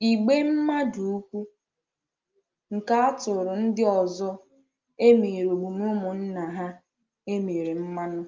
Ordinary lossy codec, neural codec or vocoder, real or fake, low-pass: Opus, 24 kbps; none; real; 7.2 kHz